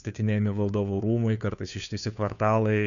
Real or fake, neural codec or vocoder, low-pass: fake; codec, 16 kHz, 2 kbps, FunCodec, trained on Chinese and English, 25 frames a second; 7.2 kHz